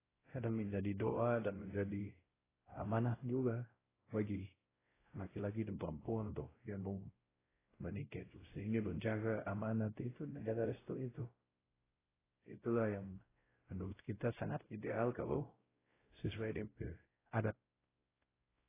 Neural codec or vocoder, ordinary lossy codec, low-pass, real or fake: codec, 16 kHz, 0.5 kbps, X-Codec, WavLM features, trained on Multilingual LibriSpeech; AAC, 16 kbps; 3.6 kHz; fake